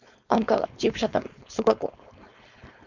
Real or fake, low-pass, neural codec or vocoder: fake; 7.2 kHz; codec, 16 kHz, 4.8 kbps, FACodec